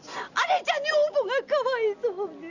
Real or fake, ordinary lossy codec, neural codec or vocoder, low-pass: real; none; none; 7.2 kHz